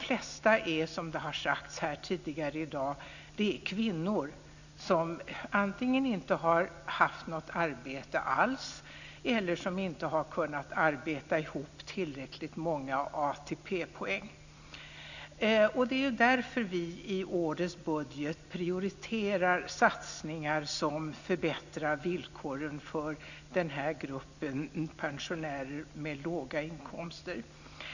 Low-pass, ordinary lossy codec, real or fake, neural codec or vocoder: 7.2 kHz; none; real; none